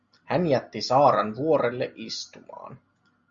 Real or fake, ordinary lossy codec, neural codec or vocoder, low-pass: real; Opus, 64 kbps; none; 7.2 kHz